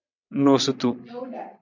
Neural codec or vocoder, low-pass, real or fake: vocoder, 22.05 kHz, 80 mel bands, WaveNeXt; 7.2 kHz; fake